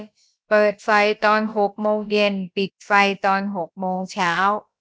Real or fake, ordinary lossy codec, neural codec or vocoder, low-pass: fake; none; codec, 16 kHz, about 1 kbps, DyCAST, with the encoder's durations; none